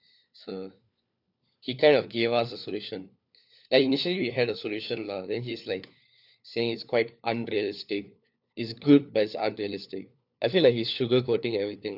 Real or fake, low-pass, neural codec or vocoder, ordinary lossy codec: fake; 5.4 kHz; codec, 16 kHz, 4 kbps, FunCodec, trained on LibriTTS, 50 frames a second; none